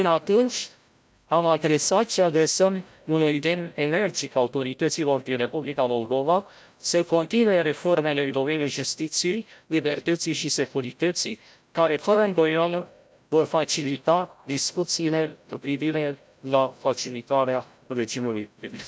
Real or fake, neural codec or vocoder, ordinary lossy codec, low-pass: fake; codec, 16 kHz, 0.5 kbps, FreqCodec, larger model; none; none